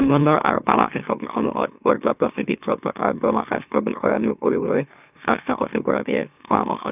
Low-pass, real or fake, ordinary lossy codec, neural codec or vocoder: 3.6 kHz; fake; none; autoencoder, 44.1 kHz, a latent of 192 numbers a frame, MeloTTS